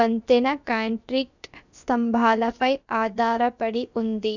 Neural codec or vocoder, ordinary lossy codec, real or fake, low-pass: codec, 16 kHz, about 1 kbps, DyCAST, with the encoder's durations; none; fake; 7.2 kHz